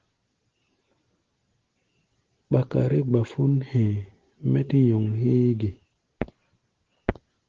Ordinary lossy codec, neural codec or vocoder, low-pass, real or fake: Opus, 16 kbps; none; 7.2 kHz; real